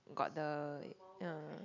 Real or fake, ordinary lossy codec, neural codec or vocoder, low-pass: real; none; none; 7.2 kHz